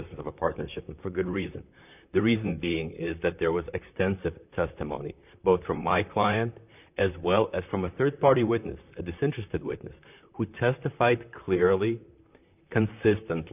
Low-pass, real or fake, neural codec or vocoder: 3.6 kHz; fake; vocoder, 44.1 kHz, 128 mel bands, Pupu-Vocoder